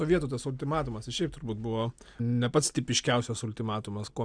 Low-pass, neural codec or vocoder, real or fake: 9.9 kHz; none; real